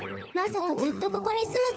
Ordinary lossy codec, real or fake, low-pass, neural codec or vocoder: none; fake; none; codec, 16 kHz, 4 kbps, FunCodec, trained on LibriTTS, 50 frames a second